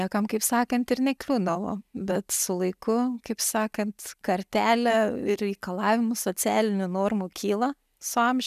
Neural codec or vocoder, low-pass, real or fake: none; 14.4 kHz; real